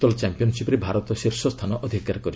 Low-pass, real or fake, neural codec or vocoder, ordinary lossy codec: none; real; none; none